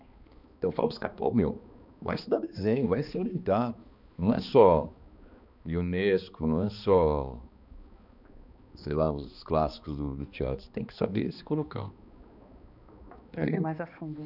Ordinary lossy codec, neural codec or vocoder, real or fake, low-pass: none; codec, 16 kHz, 2 kbps, X-Codec, HuBERT features, trained on balanced general audio; fake; 5.4 kHz